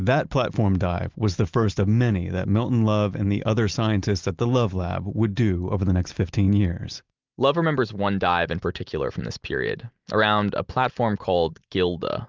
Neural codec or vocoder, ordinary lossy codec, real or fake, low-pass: none; Opus, 24 kbps; real; 7.2 kHz